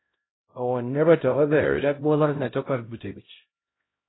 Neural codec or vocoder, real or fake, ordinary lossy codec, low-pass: codec, 16 kHz, 0.5 kbps, X-Codec, HuBERT features, trained on LibriSpeech; fake; AAC, 16 kbps; 7.2 kHz